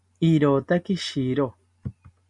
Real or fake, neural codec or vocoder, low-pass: real; none; 10.8 kHz